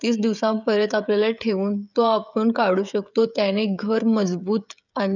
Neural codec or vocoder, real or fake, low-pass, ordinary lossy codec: codec, 16 kHz, 16 kbps, FreqCodec, smaller model; fake; 7.2 kHz; none